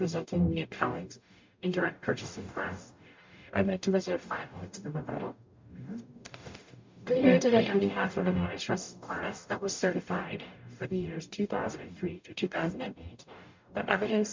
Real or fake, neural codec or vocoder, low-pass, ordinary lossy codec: fake; codec, 44.1 kHz, 0.9 kbps, DAC; 7.2 kHz; MP3, 64 kbps